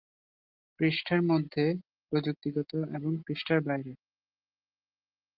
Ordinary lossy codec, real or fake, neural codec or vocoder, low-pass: Opus, 24 kbps; real; none; 5.4 kHz